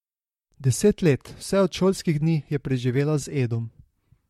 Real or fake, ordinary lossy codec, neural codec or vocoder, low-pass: fake; MP3, 64 kbps; vocoder, 44.1 kHz, 128 mel bands, Pupu-Vocoder; 19.8 kHz